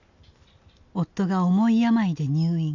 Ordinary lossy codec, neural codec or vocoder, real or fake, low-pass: none; none; real; 7.2 kHz